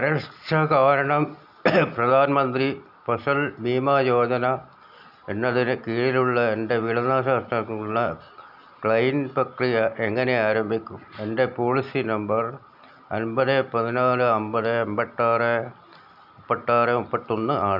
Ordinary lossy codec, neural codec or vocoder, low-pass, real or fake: none; none; 5.4 kHz; real